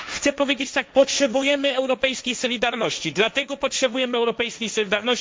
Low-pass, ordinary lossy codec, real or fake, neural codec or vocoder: none; none; fake; codec, 16 kHz, 1.1 kbps, Voila-Tokenizer